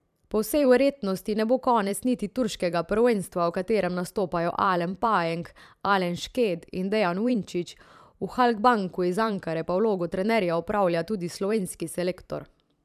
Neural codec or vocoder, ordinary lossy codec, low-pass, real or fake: vocoder, 44.1 kHz, 128 mel bands every 512 samples, BigVGAN v2; none; 14.4 kHz; fake